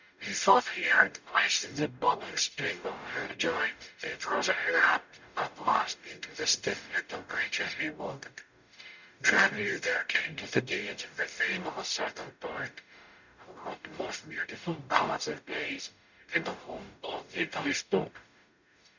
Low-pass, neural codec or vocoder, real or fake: 7.2 kHz; codec, 44.1 kHz, 0.9 kbps, DAC; fake